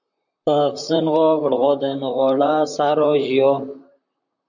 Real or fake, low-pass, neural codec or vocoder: fake; 7.2 kHz; vocoder, 44.1 kHz, 128 mel bands, Pupu-Vocoder